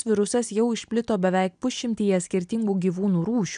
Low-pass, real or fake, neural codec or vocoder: 9.9 kHz; real; none